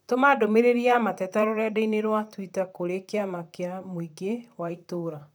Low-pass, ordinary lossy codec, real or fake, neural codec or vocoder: none; none; fake; vocoder, 44.1 kHz, 128 mel bands, Pupu-Vocoder